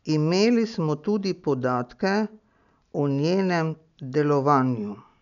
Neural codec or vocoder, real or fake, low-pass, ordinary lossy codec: none; real; 7.2 kHz; MP3, 96 kbps